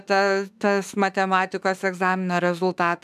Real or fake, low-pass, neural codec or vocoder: fake; 14.4 kHz; autoencoder, 48 kHz, 32 numbers a frame, DAC-VAE, trained on Japanese speech